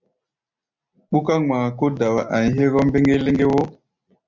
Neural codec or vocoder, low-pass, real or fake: none; 7.2 kHz; real